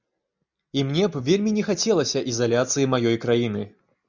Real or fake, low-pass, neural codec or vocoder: real; 7.2 kHz; none